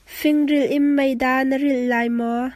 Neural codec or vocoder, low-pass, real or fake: none; 14.4 kHz; real